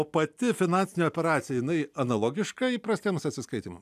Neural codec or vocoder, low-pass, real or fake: none; 14.4 kHz; real